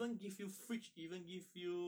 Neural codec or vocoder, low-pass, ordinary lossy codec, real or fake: none; none; none; real